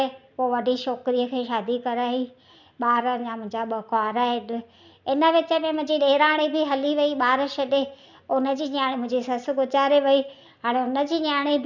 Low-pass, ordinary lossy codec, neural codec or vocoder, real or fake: 7.2 kHz; none; none; real